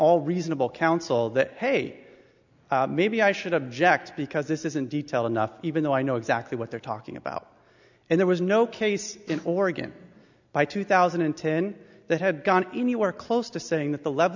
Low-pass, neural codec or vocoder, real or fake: 7.2 kHz; none; real